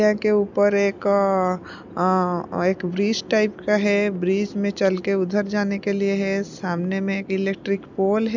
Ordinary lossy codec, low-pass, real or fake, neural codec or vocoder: none; 7.2 kHz; real; none